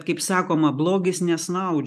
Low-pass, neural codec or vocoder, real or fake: 14.4 kHz; none; real